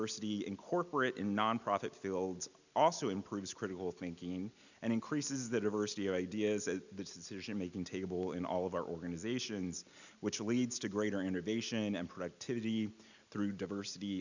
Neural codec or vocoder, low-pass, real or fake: none; 7.2 kHz; real